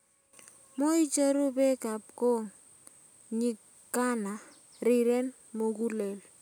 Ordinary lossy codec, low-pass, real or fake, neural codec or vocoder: none; none; real; none